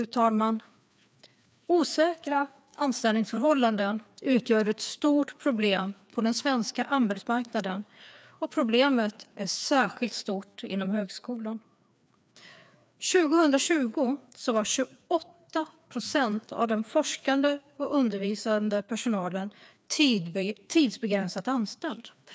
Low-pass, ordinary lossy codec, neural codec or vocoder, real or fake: none; none; codec, 16 kHz, 2 kbps, FreqCodec, larger model; fake